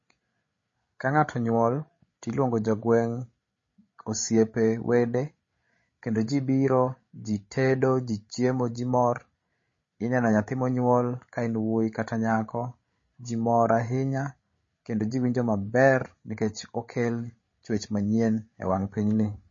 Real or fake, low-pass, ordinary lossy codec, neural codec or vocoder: fake; 7.2 kHz; MP3, 32 kbps; codec, 16 kHz, 16 kbps, FreqCodec, larger model